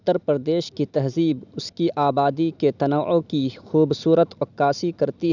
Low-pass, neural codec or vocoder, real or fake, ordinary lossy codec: 7.2 kHz; none; real; none